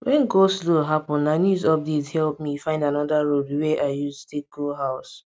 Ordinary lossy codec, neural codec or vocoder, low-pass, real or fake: none; none; none; real